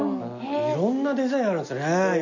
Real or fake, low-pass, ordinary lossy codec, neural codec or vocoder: real; 7.2 kHz; none; none